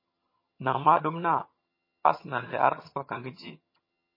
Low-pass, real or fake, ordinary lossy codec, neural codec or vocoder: 5.4 kHz; fake; MP3, 24 kbps; vocoder, 22.05 kHz, 80 mel bands, HiFi-GAN